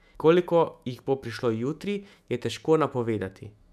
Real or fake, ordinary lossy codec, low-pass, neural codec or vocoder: fake; none; 14.4 kHz; autoencoder, 48 kHz, 128 numbers a frame, DAC-VAE, trained on Japanese speech